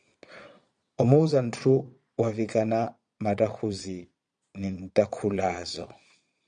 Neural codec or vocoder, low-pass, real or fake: vocoder, 22.05 kHz, 80 mel bands, Vocos; 9.9 kHz; fake